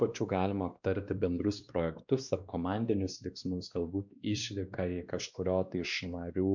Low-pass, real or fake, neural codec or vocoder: 7.2 kHz; fake; codec, 16 kHz, 2 kbps, X-Codec, WavLM features, trained on Multilingual LibriSpeech